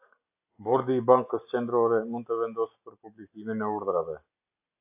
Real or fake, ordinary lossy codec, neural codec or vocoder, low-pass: real; AAC, 32 kbps; none; 3.6 kHz